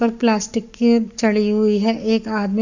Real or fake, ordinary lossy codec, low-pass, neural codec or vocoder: fake; none; 7.2 kHz; codec, 44.1 kHz, 7.8 kbps, Pupu-Codec